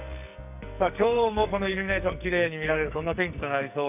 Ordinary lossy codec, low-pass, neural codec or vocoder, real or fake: none; 3.6 kHz; codec, 44.1 kHz, 2.6 kbps, SNAC; fake